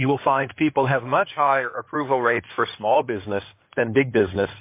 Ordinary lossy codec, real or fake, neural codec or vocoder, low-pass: MP3, 24 kbps; fake; codec, 16 kHz in and 24 kHz out, 2.2 kbps, FireRedTTS-2 codec; 3.6 kHz